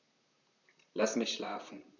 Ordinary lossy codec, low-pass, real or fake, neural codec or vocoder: none; 7.2 kHz; fake; vocoder, 44.1 kHz, 128 mel bands, Pupu-Vocoder